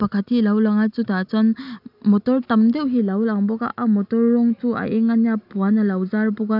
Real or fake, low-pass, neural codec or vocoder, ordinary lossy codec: real; 5.4 kHz; none; none